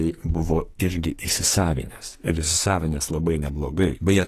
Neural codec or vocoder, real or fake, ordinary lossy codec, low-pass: codec, 44.1 kHz, 2.6 kbps, SNAC; fake; AAC, 48 kbps; 14.4 kHz